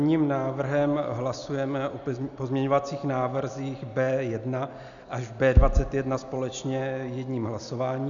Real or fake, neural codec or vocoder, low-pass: real; none; 7.2 kHz